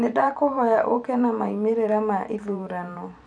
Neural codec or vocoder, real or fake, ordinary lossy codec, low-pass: vocoder, 44.1 kHz, 128 mel bands every 512 samples, BigVGAN v2; fake; none; 9.9 kHz